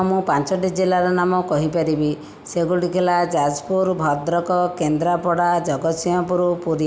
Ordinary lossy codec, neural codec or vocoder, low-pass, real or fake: none; none; none; real